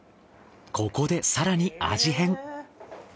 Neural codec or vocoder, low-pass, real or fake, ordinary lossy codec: none; none; real; none